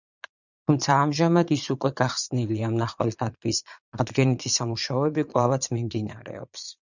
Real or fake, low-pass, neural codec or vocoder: fake; 7.2 kHz; vocoder, 22.05 kHz, 80 mel bands, Vocos